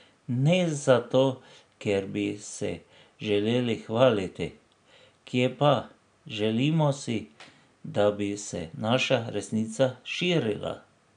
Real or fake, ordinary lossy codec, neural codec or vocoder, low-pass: real; none; none; 9.9 kHz